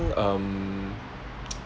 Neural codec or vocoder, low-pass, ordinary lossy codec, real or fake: none; none; none; real